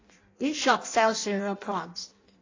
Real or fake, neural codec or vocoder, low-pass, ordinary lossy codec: fake; codec, 16 kHz in and 24 kHz out, 0.6 kbps, FireRedTTS-2 codec; 7.2 kHz; AAC, 32 kbps